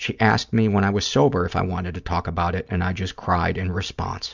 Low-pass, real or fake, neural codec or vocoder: 7.2 kHz; real; none